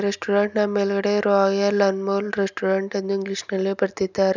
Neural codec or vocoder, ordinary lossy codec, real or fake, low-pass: none; none; real; 7.2 kHz